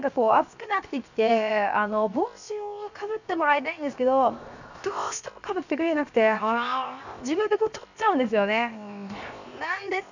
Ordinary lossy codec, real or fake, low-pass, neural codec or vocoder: none; fake; 7.2 kHz; codec, 16 kHz, 0.7 kbps, FocalCodec